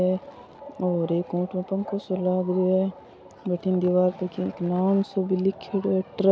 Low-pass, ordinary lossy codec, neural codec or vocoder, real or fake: none; none; none; real